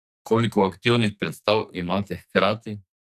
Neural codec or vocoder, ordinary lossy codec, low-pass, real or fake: codec, 44.1 kHz, 2.6 kbps, SNAC; none; 14.4 kHz; fake